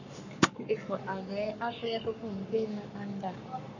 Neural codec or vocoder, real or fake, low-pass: codec, 44.1 kHz, 7.8 kbps, Pupu-Codec; fake; 7.2 kHz